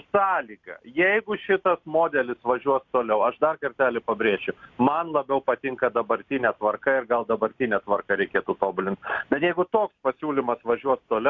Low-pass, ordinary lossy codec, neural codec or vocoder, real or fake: 7.2 kHz; Opus, 64 kbps; none; real